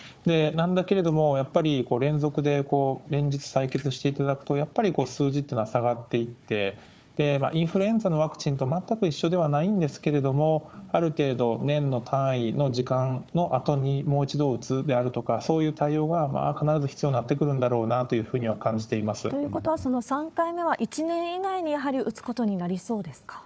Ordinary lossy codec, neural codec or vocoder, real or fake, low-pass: none; codec, 16 kHz, 4 kbps, FunCodec, trained on Chinese and English, 50 frames a second; fake; none